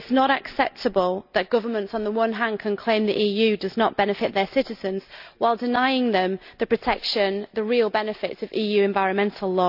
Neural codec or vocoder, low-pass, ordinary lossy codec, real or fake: none; 5.4 kHz; none; real